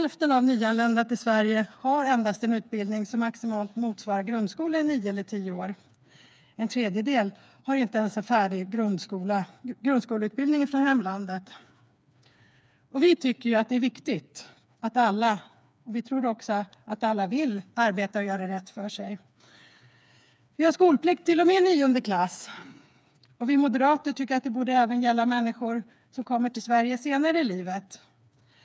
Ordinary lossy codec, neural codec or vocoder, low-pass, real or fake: none; codec, 16 kHz, 4 kbps, FreqCodec, smaller model; none; fake